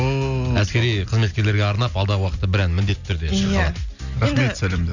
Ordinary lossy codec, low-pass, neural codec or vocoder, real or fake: none; 7.2 kHz; none; real